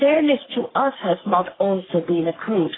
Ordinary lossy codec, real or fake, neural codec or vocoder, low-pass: AAC, 16 kbps; fake; codec, 44.1 kHz, 3.4 kbps, Pupu-Codec; 7.2 kHz